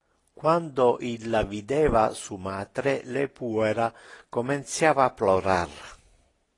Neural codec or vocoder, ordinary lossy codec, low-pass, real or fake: none; AAC, 32 kbps; 10.8 kHz; real